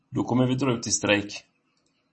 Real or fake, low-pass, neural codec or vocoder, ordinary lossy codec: real; 10.8 kHz; none; MP3, 32 kbps